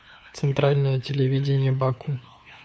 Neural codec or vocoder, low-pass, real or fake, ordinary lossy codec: codec, 16 kHz, 2 kbps, FunCodec, trained on LibriTTS, 25 frames a second; none; fake; none